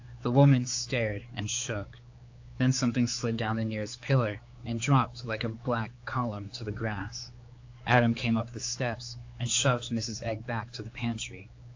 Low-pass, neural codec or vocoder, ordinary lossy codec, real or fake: 7.2 kHz; codec, 16 kHz, 4 kbps, X-Codec, HuBERT features, trained on general audio; AAC, 48 kbps; fake